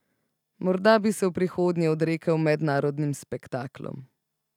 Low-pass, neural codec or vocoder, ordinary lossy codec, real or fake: 19.8 kHz; none; none; real